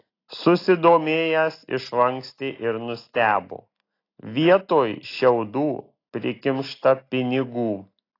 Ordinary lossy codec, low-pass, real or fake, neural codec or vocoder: AAC, 32 kbps; 5.4 kHz; real; none